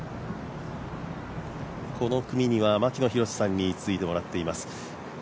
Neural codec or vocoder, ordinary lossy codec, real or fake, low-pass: none; none; real; none